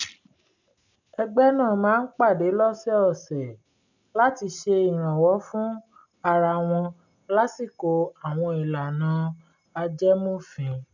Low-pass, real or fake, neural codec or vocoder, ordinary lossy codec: 7.2 kHz; real; none; none